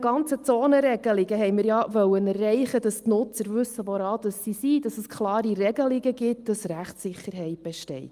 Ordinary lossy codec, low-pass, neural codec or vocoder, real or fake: Opus, 32 kbps; 14.4 kHz; none; real